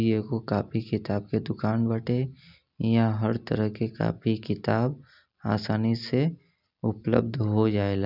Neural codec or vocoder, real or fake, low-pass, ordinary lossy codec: none; real; 5.4 kHz; none